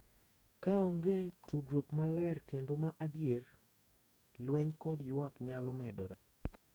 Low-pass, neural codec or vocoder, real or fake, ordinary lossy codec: none; codec, 44.1 kHz, 2.6 kbps, DAC; fake; none